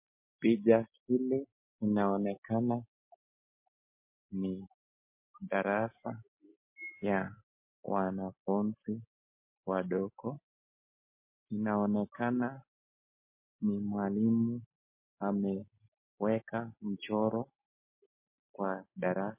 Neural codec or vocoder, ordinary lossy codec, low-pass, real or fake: none; MP3, 24 kbps; 3.6 kHz; real